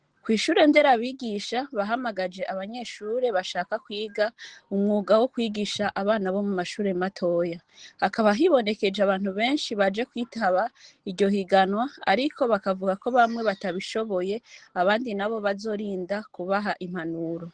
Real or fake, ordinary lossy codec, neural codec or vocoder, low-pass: real; Opus, 16 kbps; none; 9.9 kHz